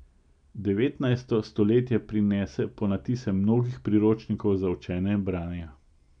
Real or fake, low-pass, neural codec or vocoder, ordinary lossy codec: real; 9.9 kHz; none; none